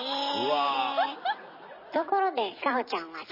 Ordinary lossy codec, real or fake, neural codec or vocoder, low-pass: MP3, 24 kbps; real; none; 5.4 kHz